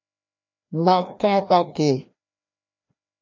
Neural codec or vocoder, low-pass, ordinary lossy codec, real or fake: codec, 16 kHz, 1 kbps, FreqCodec, larger model; 7.2 kHz; MP3, 48 kbps; fake